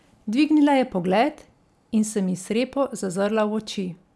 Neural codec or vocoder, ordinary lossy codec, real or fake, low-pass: none; none; real; none